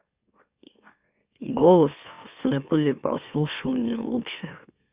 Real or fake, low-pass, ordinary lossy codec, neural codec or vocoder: fake; 3.6 kHz; Opus, 64 kbps; autoencoder, 44.1 kHz, a latent of 192 numbers a frame, MeloTTS